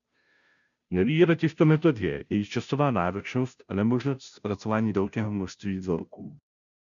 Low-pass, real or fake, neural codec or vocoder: 7.2 kHz; fake; codec, 16 kHz, 0.5 kbps, FunCodec, trained on Chinese and English, 25 frames a second